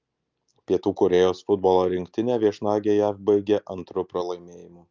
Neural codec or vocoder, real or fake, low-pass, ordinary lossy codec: none; real; 7.2 kHz; Opus, 24 kbps